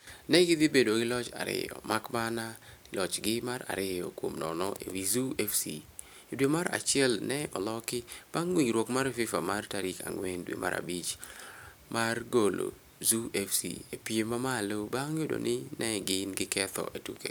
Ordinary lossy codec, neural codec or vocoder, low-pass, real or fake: none; none; none; real